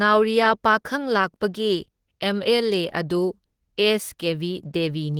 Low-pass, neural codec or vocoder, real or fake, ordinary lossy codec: 19.8 kHz; autoencoder, 48 kHz, 32 numbers a frame, DAC-VAE, trained on Japanese speech; fake; Opus, 16 kbps